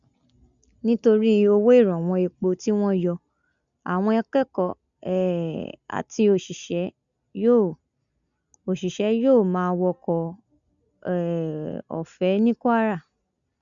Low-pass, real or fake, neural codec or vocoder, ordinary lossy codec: 7.2 kHz; real; none; none